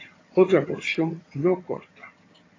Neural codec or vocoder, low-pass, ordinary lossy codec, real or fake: vocoder, 22.05 kHz, 80 mel bands, HiFi-GAN; 7.2 kHz; AAC, 32 kbps; fake